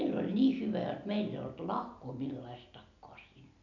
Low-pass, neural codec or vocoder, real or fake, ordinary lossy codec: 7.2 kHz; none; real; none